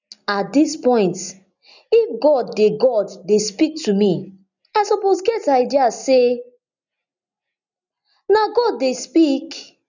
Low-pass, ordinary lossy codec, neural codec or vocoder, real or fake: 7.2 kHz; none; none; real